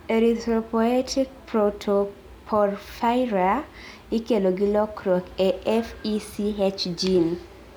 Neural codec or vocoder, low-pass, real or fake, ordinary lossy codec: none; none; real; none